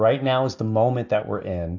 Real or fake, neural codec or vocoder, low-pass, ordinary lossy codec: real; none; 7.2 kHz; AAC, 48 kbps